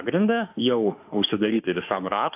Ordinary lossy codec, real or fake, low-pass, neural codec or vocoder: AAC, 32 kbps; fake; 3.6 kHz; autoencoder, 48 kHz, 32 numbers a frame, DAC-VAE, trained on Japanese speech